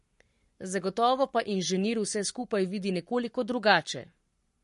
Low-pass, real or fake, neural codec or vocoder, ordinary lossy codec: 14.4 kHz; fake; codec, 44.1 kHz, 7.8 kbps, Pupu-Codec; MP3, 48 kbps